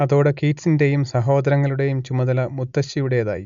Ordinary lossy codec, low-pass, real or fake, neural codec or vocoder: MP3, 64 kbps; 7.2 kHz; real; none